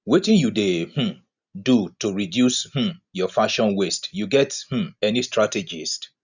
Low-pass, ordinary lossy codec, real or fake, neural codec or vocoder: 7.2 kHz; none; real; none